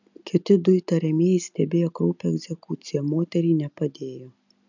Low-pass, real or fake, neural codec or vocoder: 7.2 kHz; real; none